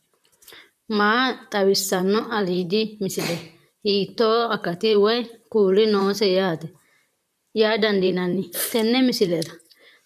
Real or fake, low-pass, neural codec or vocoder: fake; 14.4 kHz; vocoder, 44.1 kHz, 128 mel bands, Pupu-Vocoder